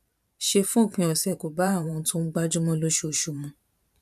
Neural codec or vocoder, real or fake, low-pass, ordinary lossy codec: vocoder, 44.1 kHz, 128 mel bands every 512 samples, BigVGAN v2; fake; 14.4 kHz; none